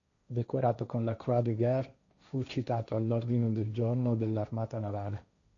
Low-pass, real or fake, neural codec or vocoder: 7.2 kHz; fake; codec, 16 kHz, 1.1 kbps, Voila-Tokenizer